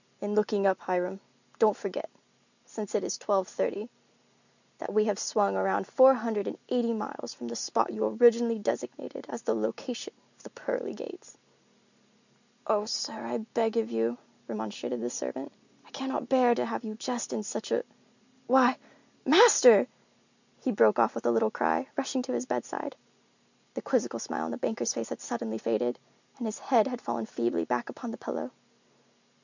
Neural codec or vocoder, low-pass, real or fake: none; 7.2 kHz; real